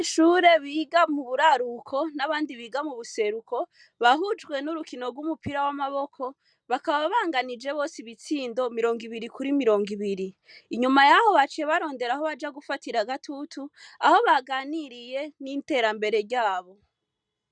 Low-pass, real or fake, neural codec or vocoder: 9.9 kHz; real; none